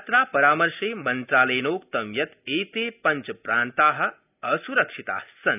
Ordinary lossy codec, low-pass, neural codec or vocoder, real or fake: none; 3.6 kHz; none; real